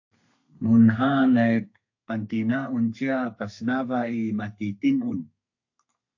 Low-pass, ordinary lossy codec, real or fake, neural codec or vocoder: 7.2 kHz; AAC, 48 kbps; fake; codec, 32 kHz, 1.9 kbps, SNAC